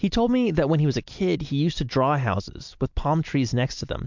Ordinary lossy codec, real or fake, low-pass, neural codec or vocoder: MP3, 64 kbps; real; 7.2 kHz; none